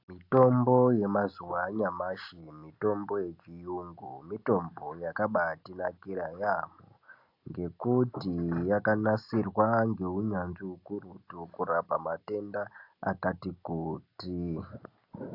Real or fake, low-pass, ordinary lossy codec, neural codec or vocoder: real; 5.4 kHz; AAC, 48 kbps; none